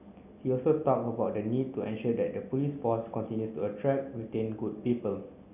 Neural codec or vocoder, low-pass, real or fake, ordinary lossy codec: none; 3.6 kHz; real; none